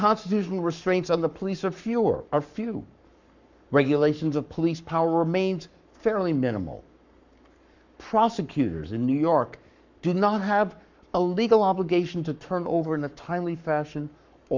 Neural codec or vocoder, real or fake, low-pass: codec, 44.1 kHz, 7.8 kbps, Pupu-Codec; fake; 7.2 kHz